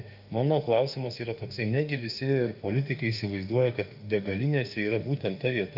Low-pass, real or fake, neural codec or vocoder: 5.4 kHz; fake; autoencoder, 48 kHz, 32 numbers a frame, DAC-VAE, trained on Japanese speech